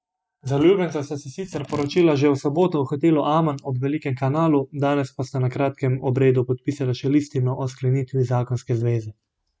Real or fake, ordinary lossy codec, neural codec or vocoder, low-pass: real; none; none; none